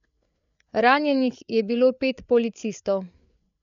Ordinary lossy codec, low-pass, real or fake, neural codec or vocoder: none; 7.2 kHz; fake; codec, 16 kHz, 8 kbps, FreqCodec, larger model